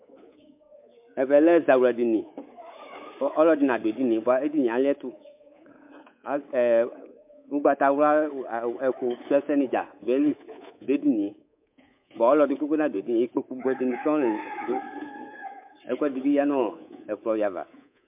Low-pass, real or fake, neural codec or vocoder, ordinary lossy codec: 3.6 kHz; fake; codec, 24 kHz, 3.1 kbps, DualCodec; MP3, 32 kbps